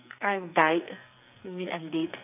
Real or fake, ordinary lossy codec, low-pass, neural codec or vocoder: fake; none; 3.6 kHz; codec, 44.1 kHz, 2.6 kbps, SNAC